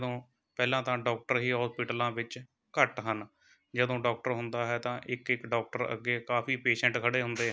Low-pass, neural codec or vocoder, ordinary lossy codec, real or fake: none; none; none; real